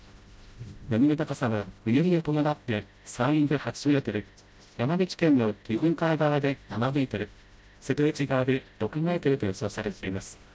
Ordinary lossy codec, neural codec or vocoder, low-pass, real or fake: none; codec, 16 kHz, 0.5 kbps, FreqCodec, smaller model; none; fake